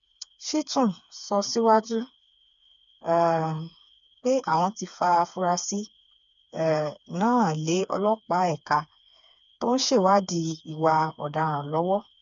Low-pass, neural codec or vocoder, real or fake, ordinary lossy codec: 7.2 kHz; codec, 16 kHz, 4 kbps, FreqCodec, smaller model; fake; none